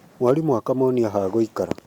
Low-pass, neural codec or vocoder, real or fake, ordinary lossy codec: 19.8 kHz; none; real; Opus, 64 kbps